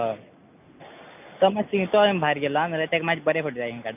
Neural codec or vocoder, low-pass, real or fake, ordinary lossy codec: none; 3.6 kHz; real; none